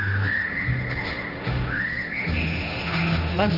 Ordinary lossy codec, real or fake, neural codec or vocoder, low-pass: Opus, 64 kbps; fake; codec, 16 kHz, 1.1 kbps, Voila-Tokenizer; 5.4 kHz